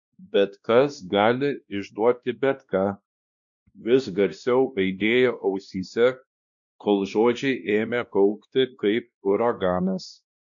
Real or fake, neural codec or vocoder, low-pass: fake; codec, 16 kHz, 1 kbps, X-Codec, WavLM features, trained on Multilingual LibriSpeech; 7.2 kHz